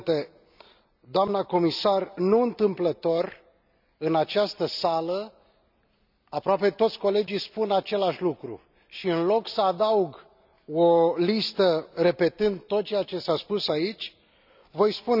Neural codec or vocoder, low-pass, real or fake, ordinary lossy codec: none; 5.4 kHz; real; none